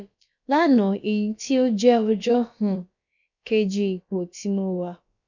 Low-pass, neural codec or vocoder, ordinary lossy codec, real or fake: 7.2 kHz; codec, 16 kHz, about 1 kbps, DyCAST, with the encoder's durations; none; fake